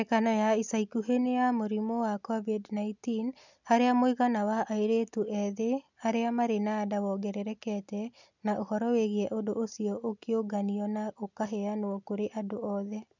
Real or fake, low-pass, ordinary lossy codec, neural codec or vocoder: real; 7.2 kHz; none; none